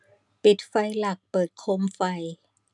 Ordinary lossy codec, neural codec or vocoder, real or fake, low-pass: none; none; real; 10.8 kHz